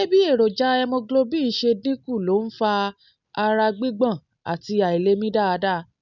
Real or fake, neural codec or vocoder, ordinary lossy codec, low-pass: real; none; none; 7.2 kHz